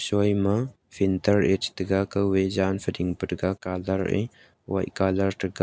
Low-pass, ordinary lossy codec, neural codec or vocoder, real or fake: none; none; none; real